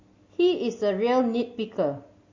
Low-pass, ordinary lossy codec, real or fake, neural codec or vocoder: 7.2 kHz; MP3, 32 kbps; real; none